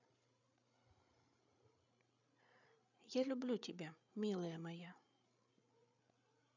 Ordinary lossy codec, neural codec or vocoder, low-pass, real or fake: none; codec, 16 kHz, 8 kbps, FreqCodec, larger model; 7.2 kHz; fake